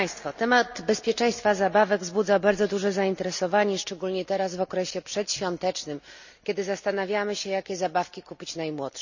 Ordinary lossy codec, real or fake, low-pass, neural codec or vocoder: none; real; 7.2 kHz; none